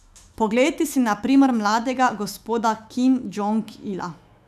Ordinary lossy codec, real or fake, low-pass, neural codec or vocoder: none; fake; 14.4 kHz; autoencoder, 48 kHz, 128 numbers a frame, DAC-VAE, trained on Japanese speech